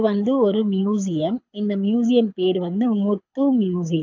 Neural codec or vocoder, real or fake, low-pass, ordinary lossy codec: codec, 24 kHz, 6 kbps, HILCodec; fake; 7.2 kHz; AAC, 48 kbps